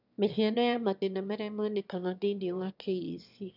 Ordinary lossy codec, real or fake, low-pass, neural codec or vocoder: none; fake; 5.4 kHz; autoencoder, 22.05 kHz, a latent of 192 numbers a frame, VITS, trained on one speaker